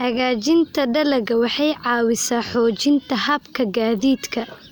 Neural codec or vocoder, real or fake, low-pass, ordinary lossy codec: none; real; none; none